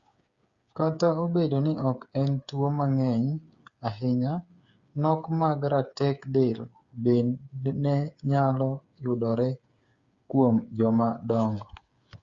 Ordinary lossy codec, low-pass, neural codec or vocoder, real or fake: Opus, 64 kbps; 7.2 kHz; codec, 16 kHz, 8 kbps, FreqCodec, smaller model; fake